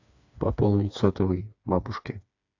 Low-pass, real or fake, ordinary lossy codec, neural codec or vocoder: 7.2 kHz; fake; AAC, 48 kbps; codec, 16 kHz, 4 kbps, FreqCodec, smaller model